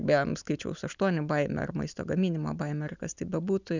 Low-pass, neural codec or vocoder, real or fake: 7.2 kHz; none; real